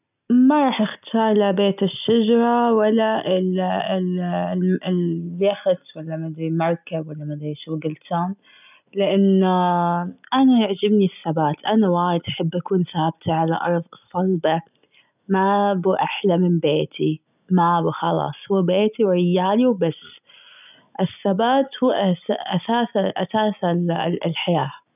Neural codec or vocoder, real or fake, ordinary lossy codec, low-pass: none; real; none; 3.6 kHz